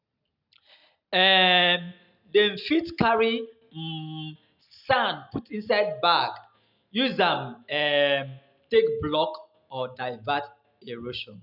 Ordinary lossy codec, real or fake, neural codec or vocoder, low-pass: none; real; none; 5.4 kHz